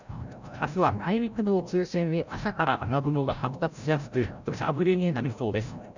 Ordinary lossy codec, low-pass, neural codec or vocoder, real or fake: none; 7.2 kHz; codec, 16 kHz, 0.5 kbps, FreqCodec, larger model; fake